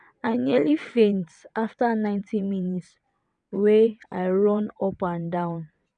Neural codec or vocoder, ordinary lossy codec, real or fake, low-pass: vocoder, 22.05 kHz, 80 mel bands, WaveNeXt; none; fake; 9.9 kHz